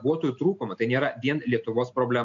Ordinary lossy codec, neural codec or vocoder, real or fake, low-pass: MP3, 48 kbps; none; real; 7.2 kHz